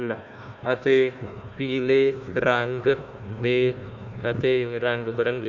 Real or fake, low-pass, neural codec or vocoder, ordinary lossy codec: fake; 7.2 kHz; codec, 16 kHz, 1 kbps, FunCodec, trained on Chinese and English, 50 frames a second; none